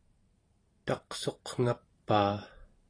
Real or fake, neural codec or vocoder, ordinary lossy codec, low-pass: fake; vocoder, 44.1 kHz, 128 mel bands every 512 samples, BigVGAN v2; AAC, 32 kbps; 9.9 kHz